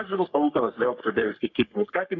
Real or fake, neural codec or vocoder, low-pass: fake; codec, 44.1 kHz, 3.4 kbps, Pupu-Codec; 7.2 kHz